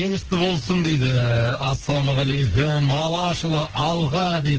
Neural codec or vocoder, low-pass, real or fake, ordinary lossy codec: codec, 16 kHz, 2 kbps, FreqCodec, smaller model; 7.2 kHz; fake; Opus, 16 kbps